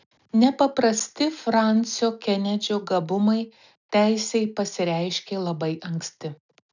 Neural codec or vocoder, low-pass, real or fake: none; 7.2 kHz; real